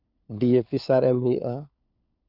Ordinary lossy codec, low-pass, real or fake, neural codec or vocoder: none; 5.4 kHz; fake; codec, 16 kHz, 4 kbps, FunCodec, trained on LibriTTS, 50 frames a second